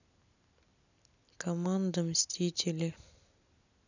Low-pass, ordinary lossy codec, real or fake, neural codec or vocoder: 7.2 kHz; none; real; none